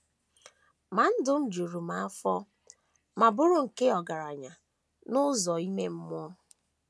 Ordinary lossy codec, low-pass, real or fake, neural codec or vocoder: none; none; real; none